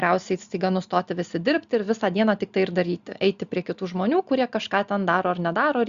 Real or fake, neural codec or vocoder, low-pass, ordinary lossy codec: real; none; 7.2 kHz; Opus, 64 kbps